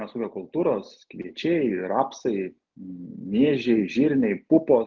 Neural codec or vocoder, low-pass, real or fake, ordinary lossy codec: none; 7.2 kHz; real; Opus, 32 kbps